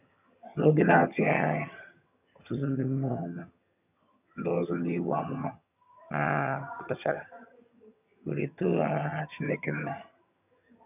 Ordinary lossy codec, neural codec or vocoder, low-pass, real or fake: none; vocoder, 22.05 kHz, 80 mel bands, HiFi-GAN; 3.6 kHz; fake